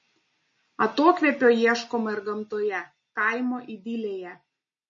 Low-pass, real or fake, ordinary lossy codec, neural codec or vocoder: 7.2 kHz; real; MP3, 32 kbps; none